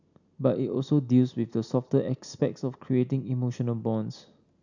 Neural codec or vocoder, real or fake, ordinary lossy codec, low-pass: none; real; none; 7.2 kHz